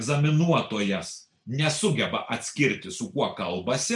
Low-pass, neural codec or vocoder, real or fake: 10.8 kHz; none; real